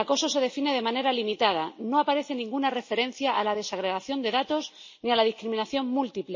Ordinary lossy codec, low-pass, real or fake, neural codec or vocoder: MP3, 32 kbps; 7.2 kHz; real; none